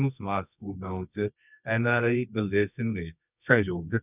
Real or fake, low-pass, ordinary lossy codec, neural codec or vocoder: fake; 3.6 kHz; none; codec, 24 kHz, 0.9 kbps, WavTokenizer, medium music audio release